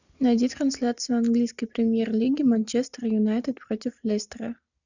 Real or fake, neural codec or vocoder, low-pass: real; none; 7.2 kHz